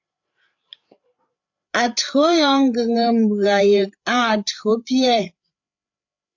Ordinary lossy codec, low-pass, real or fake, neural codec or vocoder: AAC, 48 kbps; 7.2 kHz; fake; codec, 16 kHz, 8 kbps, FreqCodec, larger model